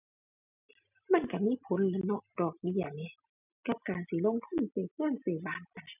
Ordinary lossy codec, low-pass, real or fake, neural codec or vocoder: none; 3.6 kHz; real; none